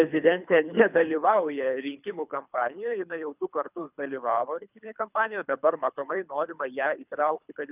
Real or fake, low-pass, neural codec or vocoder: fake; 3.6 kHz; codec, 24 kHz, 3 kbps, HILCodec